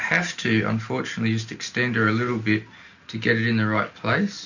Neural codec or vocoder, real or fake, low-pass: none; real; 7.2 kHz